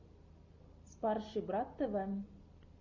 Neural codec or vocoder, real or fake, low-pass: none; real; 7.2 kHz